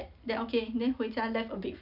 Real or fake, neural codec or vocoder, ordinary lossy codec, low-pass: real; none; none; 5.4 kHz